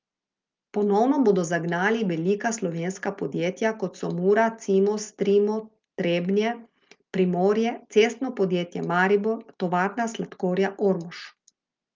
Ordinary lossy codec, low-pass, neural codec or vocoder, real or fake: Opus, 24 kbps; 7.2 kHz; none; real